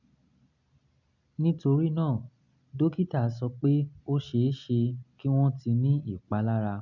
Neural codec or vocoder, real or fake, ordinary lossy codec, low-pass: none; real; none; 7.2 kHz